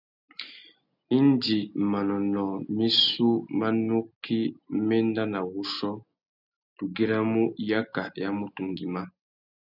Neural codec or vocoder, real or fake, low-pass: none; real; 5.4 kHz